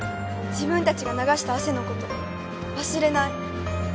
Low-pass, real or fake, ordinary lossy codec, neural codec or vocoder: none; real; none; none